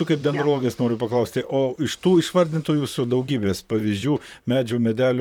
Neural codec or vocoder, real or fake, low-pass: vocoder, 44.1 kHz, 128 mel bands, Pupu-Vocoder; fake; 19.8 kHz